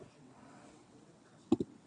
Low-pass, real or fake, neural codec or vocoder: 9.9 kHz; fake; vocoder, 22.05 kHz, 80 mel bands, WaveNeXt